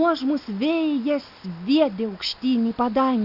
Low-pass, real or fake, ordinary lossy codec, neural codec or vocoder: 5.4 kHz; real; Opus, 64 kbps; none